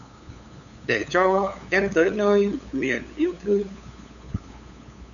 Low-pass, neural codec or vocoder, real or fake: 7.2 kHz; codec, 16 kHz, 8 kbps, FunCodec, trained on LibriTTS, 25 frames a second; fake